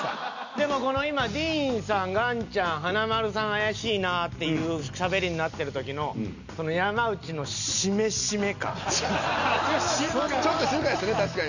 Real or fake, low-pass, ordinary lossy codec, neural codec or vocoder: real; 7.2 kHz; none; none